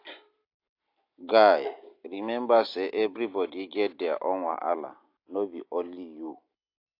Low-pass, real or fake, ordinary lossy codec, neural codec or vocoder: 5.4 kHz; real; AAC, 32 kbps; none